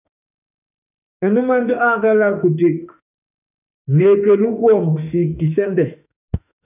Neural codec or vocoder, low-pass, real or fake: autoencoder, 48 kHz, 32 numbers a frame, DAC-VAE, trained on Japanese speech; 3.6 kHz; fake